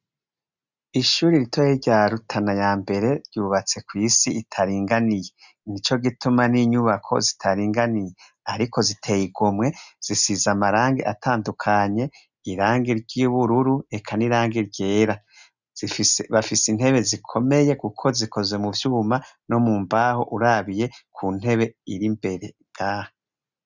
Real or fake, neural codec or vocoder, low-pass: real; none; 7.2 kHz